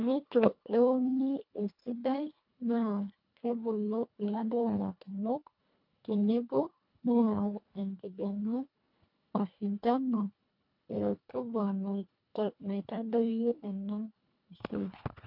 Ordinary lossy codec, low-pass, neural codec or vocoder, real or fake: MP3, 48 kbps; 5.4 kHz; codec, 24 kHz, 1.5 kbps, HILCodec; fake